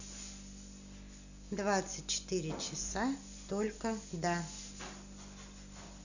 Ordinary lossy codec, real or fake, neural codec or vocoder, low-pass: none; real; none; 7.2 kHz